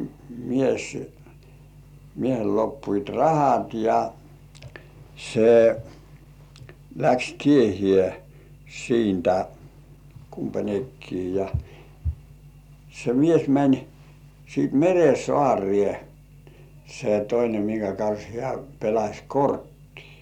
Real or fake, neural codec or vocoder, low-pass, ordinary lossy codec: real; none; 19.8 kHz; none